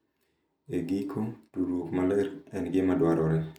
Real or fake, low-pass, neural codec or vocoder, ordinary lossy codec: real; 19.8 kHz; none; none